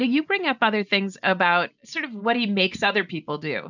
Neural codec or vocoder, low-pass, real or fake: none; 7.2 kHz; real